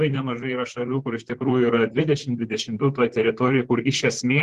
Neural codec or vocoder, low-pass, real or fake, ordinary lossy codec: vocoder, 44.1 kHz, 128 mel bands, Pupu-Vocoder; 14.4 kHz; fake; Opus, 16 kbps